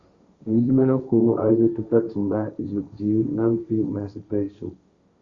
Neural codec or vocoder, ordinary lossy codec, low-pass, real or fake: codec, 16 kHz, 1.1 kbps, Voila-Tokenizer; Opus, 64 kbps; 7.2 kHz; fake